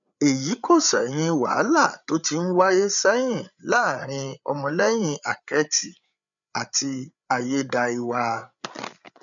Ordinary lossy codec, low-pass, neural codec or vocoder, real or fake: none; 7.2 kHz; codec, 16 kHz, 8 kbps, FreqCodec, larger model; fake